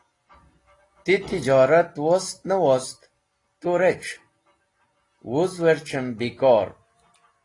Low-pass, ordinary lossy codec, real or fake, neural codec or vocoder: 10.8 kHz; AAC, 32 kbps; real; none